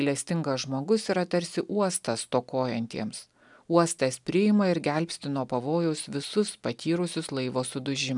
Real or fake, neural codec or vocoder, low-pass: real; none; 10.8 kHz